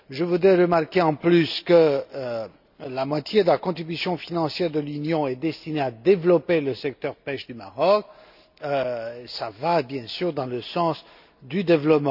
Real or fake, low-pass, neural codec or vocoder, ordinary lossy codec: real; 5.4 kHz; none; none